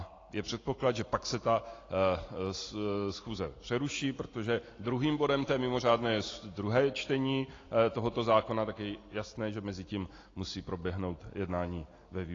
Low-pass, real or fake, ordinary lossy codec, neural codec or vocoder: 7.2 kHz; real; AAC, 32 kbps; none